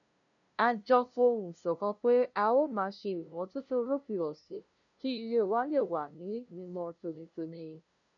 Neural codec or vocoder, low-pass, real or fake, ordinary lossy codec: codec, 16 kHz, 0.5 kbps, FunCodec, trained on LibriTTS, 25 frames a second; 7.2 kHz; fake; none